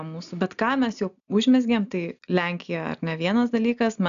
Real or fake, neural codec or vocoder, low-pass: real; none; 7.2 kHz